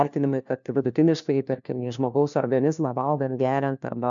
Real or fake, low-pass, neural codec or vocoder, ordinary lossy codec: fake; 7.2 kHz; codec, 16 kHz, 1 kbps, FunCodec, trained on LibriTTS, 50 frames a second; MP3, 64 kbps